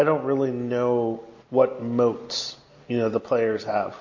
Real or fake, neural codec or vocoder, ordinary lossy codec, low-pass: real; none; MP3, 32 kbps; 7.2 kHz